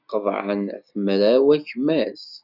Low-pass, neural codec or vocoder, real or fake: 5.4 kHz; none; real